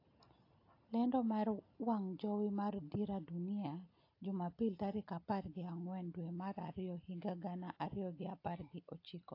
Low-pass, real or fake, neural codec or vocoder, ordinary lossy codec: 5.4 kHz; real; none; AAC, 32 kbps